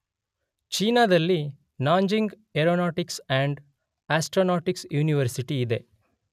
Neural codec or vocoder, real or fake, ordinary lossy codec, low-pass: none; real; none; 14.4 kHz